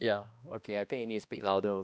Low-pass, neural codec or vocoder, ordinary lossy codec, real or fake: none; codec, 16 kHz, 1 kbps, X-Codec, HuBERT features, trained on balanced general audio; none; fake